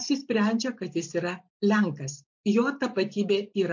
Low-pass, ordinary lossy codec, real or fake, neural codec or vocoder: 7.2 kHz; MP3, 48 kbps; real; none